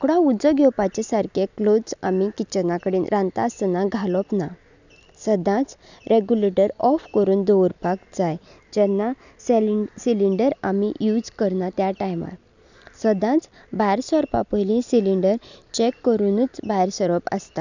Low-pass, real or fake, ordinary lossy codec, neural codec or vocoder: 7.2 kHz; real; none; none